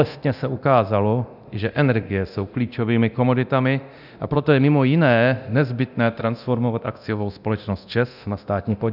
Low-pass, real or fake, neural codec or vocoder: 5.4 kHz; fake; codec, 24 kHz, 0.9 kbps, DualCodec